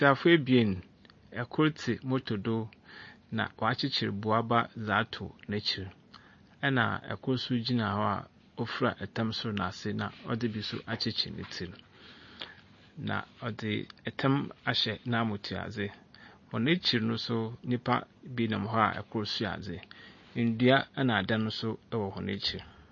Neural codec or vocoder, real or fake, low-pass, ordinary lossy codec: none; real; 7.2 kHz; MP3, 32 kbps